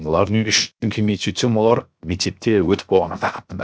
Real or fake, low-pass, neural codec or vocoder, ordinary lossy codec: fake; none; codec, 16 kHz, 0.7 kbps, FocalCodec; none